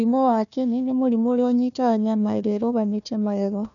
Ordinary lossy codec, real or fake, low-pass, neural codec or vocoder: none; fake; 7.2 kHz; codec, 16 kHz, 1 kbps, FunCodec, trained on Chinese and English, 50 frames a second